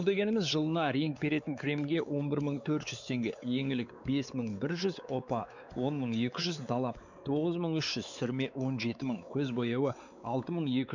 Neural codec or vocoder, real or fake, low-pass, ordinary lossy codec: codec, 16 kHz, 4 kbps, X-Codec, WavLM features, trained on Multilingual LibriSpeech; fake; 7.2 kHz; none